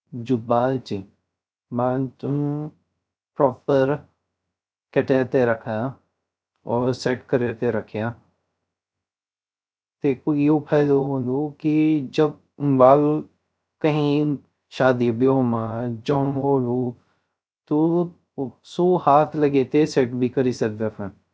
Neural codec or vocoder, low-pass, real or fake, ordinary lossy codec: codec, 16 kHz, 0.3 kbps, FocalCodec; none; fake; none